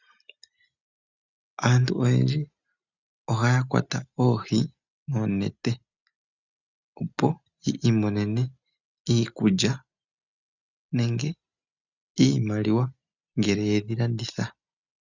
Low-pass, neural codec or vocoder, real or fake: 7.2 kHz; none; real